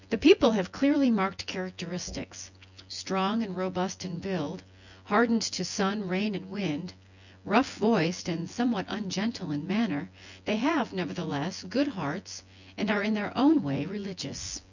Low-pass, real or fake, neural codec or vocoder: 7.2 kHz; fake; vocoder, 24 kHz, 100 mel bands, Vocos